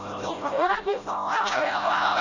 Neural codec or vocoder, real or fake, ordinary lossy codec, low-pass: codec, 16 kHz, 0.5 kbps, FreqCodec, smaller model; fake; none; 7.2 kHz